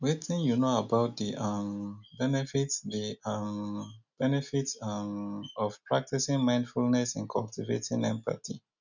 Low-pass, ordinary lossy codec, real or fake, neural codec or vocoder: 7.2 kHz; none; real; none